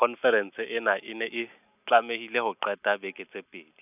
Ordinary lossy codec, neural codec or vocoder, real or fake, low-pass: none; none; real; 3.6 kHz